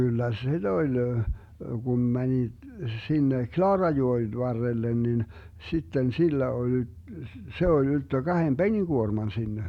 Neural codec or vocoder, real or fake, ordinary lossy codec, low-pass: none; real; none; 19.8 kHz